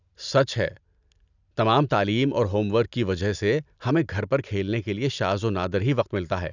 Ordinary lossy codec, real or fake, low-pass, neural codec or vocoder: none; real; 7.2 kHz; none